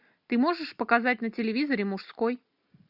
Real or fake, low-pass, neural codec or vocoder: real; 5.4 kHz; none